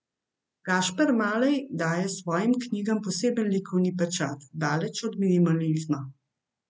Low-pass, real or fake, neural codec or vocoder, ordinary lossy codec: none; real; none; none